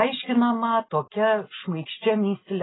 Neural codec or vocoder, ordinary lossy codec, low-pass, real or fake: none; AAC, 16 kbps; 7.2 kHz; real